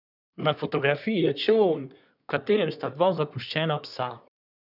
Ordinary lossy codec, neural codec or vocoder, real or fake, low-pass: none; codec, 24 kHz, 1 kbps, SNAC; fake; 5.4 kHz